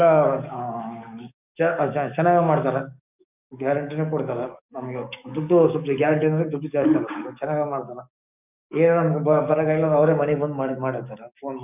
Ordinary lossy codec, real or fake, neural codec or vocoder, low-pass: none; fake; codec, 44.1 kHz, 7.8 kbps, DAC; 3.6 kHz